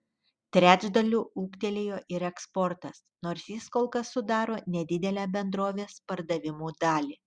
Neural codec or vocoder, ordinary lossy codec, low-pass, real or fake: none; Opus, 64 kbps; 9.9 kHz; real